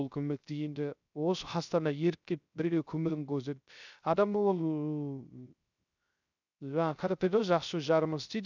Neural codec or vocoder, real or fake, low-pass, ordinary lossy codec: codec, 16 kHz, 0.3 kbps, FocalCodec; fake; 7.2 kHz; none